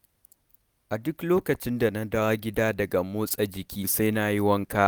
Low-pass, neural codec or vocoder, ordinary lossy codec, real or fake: none; none; none; real